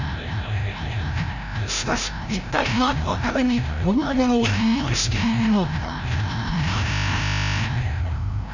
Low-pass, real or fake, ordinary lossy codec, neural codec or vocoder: 7.2 kHz; fake; none; codec, 16 kHz, 0.5 kbps, FreqCodec, larger model